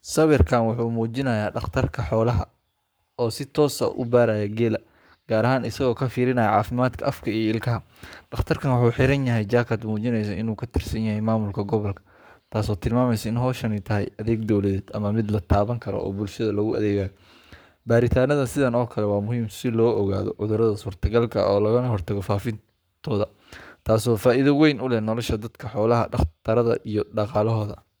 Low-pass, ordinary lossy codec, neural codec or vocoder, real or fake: none; none; codec, 44.1 kHz, 7.8 kbps, Pupu-Codec; fake